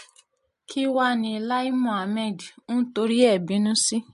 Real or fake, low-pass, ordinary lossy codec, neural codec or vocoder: real; 14.4 kHz; MP3, 48 kbps; none